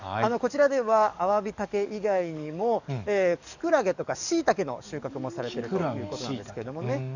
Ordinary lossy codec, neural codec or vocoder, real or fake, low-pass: none; codec, 44.1 kHz, 7.8 kbps, DAC; fake; 7.2 kHz